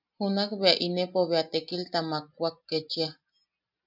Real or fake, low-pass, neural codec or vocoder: real; 5.4 kHz; none